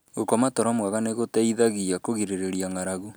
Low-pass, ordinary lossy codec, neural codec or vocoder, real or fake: none; none; none; real